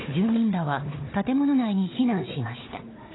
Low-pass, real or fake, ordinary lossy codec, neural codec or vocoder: 7.2 kHz; fake; AAC, 16 kbps; codec, 16 kHz, 4 kbps, FunCodec, trained on Chinese and English, 50 frames a second